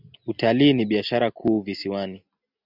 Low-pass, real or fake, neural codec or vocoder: 5.4 kHz; real; none